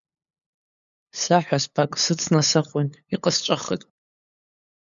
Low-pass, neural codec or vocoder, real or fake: 7.2 kHz; codec, 16 kHz, 8 kbps, FunCodec, trained on LibriTTS, 25 frames a second; fake